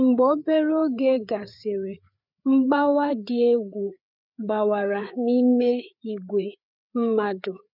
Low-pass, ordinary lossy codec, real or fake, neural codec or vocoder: 5.4 kHz; none; fake; codec, 16 kHz, 4 kbps, FreqCodec, larger model